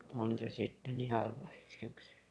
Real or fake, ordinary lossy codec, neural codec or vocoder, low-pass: fake; none; autoencoder, 22.05 kHz, a latent of 192 numbers a frame, VITS, trained on one speaker; none